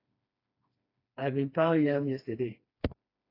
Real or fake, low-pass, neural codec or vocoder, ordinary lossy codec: fake; 5.4 kHz; codec, 16 kHz, 2 kbps, FreqCodec, smaller model; AAC, 32 kbps